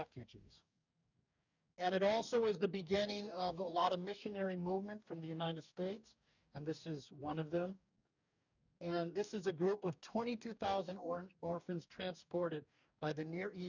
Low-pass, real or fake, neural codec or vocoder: 7.2 kHz; fake; codec, 44.1 kHz, 2.6 kbps, DAC